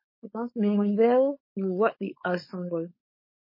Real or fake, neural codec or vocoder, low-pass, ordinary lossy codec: fake; codec, 16 kHz, 4.8 kbps, FACodec; 5.4 kHz; MP3, 24 kbps